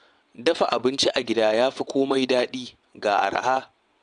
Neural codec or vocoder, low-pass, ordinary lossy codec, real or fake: vocoder, 22.05 kHz, 80 mel bands, WaveNeXt; 9.9 kHz; none; fake